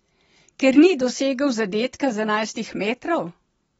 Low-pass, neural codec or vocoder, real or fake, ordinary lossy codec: 19.8 kHz; none; real; AAC, 24 kbps